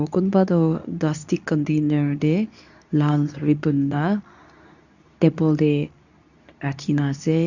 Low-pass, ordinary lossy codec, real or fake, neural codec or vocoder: 7.2 kHz; none; fake; codec, 24 kHz, 0.9 kbps, WavTokenizer, medium speech release version 2